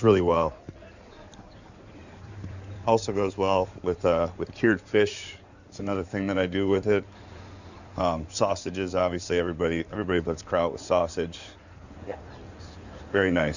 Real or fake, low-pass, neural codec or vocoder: fake; 7.2 kHz; codec, 16 kHz in and 24 kHz out, 2.2 kbps, FireRedTTS-2 codec